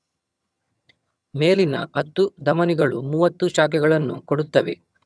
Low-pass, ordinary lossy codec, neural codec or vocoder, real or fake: none; none; vocoder, 22.05 kHz, 80 mel bands, HiFi-GAN; fake